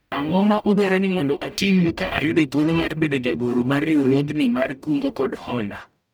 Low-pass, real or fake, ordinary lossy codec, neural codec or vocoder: none; fake; none; codec, 44.1 kHz, 0.9 kbps, DAC